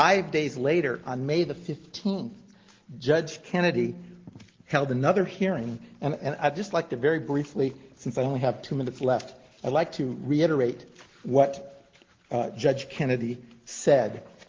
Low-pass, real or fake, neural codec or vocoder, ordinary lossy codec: 7.2 kHz; real; none; Opus, 16 kbps